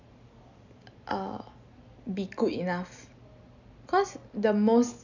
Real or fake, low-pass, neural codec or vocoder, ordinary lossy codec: real; 7.2 kHz; none; none